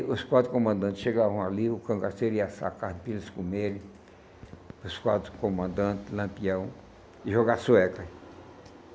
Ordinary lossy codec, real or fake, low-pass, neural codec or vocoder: none; real; none; none